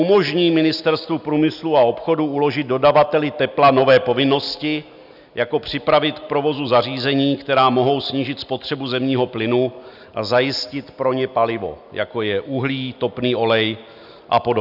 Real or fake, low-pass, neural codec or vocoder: real; 5.4 kHz; none